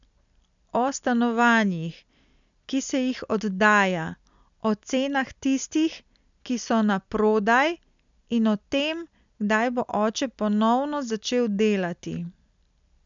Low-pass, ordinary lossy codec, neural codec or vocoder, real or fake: 7.2 kHz; none; none; real